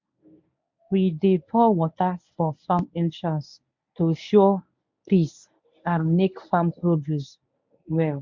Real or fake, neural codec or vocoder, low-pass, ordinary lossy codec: fake; codec, 24 kHz, 0.9 kbps, WavTokenizer, medium speech release version 1; 7.2 kHz; MP3, 64 kbps